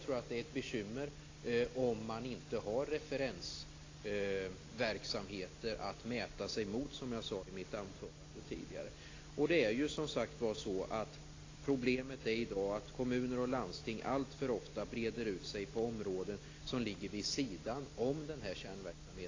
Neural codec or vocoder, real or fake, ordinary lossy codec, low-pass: none; real; AAC, 32 kbps; 7.2 kHz